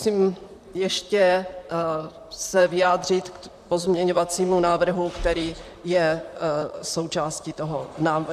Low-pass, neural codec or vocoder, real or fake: 14.4 kHz; vocoder, 44.1 kHz, 128 mel bands, Pupu-Vocoder; fake